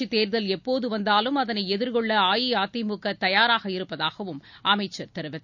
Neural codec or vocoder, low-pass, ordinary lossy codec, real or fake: none; 7.2 kHz; none; real